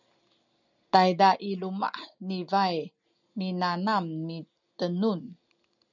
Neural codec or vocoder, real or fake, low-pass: none; real; 7.2 kHz